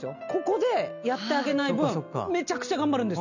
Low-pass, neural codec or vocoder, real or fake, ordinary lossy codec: 7.2 kHz; none; real; none